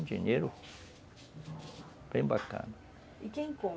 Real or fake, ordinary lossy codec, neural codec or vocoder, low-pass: real; none; none; none